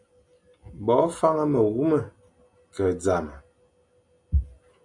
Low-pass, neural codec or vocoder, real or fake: 10.8 kHz; none; real